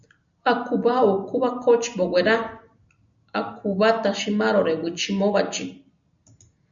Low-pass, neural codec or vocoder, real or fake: 7.2 kHz; none; real